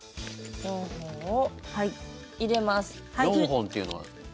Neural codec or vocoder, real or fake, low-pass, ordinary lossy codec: none; real; none; none